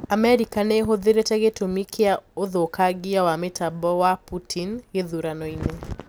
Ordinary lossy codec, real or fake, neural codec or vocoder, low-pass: none; real; none; none